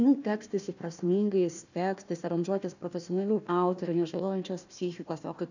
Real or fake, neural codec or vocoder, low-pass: fake; codec, 16 kHz, 1 kbps, FunCodec, trained on Chinese and English, 50 frames a second; 7.2 kHz